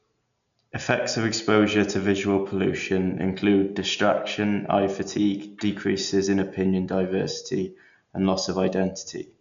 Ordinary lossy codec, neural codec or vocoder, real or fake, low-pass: MP3, 96 kbps; none; real; 7.2 kHz